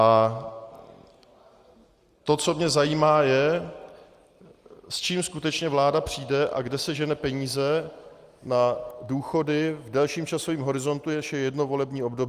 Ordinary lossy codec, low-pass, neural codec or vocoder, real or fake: Opus, 32 kbps; 14.4 kHz; none; real